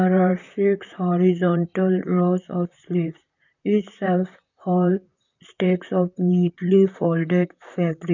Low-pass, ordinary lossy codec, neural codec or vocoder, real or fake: 7.2 kHz; none; vocoder, 44.1 kHz, 128 mel bands, Pupu-Vocoder; fake